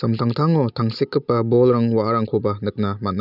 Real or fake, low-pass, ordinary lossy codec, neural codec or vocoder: real; 5.4 kHz; none; none